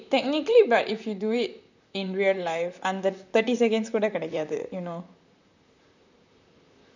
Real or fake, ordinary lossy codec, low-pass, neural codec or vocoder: fake; none; 7.2 kHz; vocoder, 44.1 kHz, 128 mel bands, Pupu-Vocoder